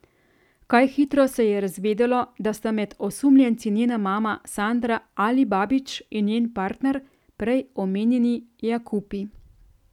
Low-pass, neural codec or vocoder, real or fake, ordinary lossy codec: 19.8 kHz; none; real; none